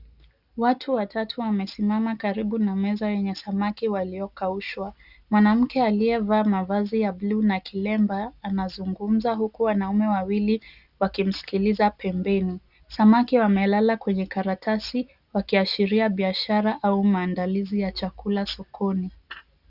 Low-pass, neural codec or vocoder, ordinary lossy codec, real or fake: 5.4 kHz; none; Opus, 64 kbps; real